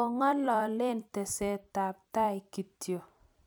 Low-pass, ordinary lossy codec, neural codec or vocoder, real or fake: none; none; vocoder, 44.1 kHz, 128 mel bands every 256 samples, BigVGAN v2; fake